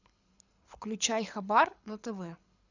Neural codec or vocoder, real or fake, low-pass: codec, 24 kHz, 6 kbps, HILCodec; fake; 7.2 kHz